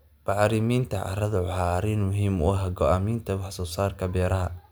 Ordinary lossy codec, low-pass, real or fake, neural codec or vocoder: none; none; real; none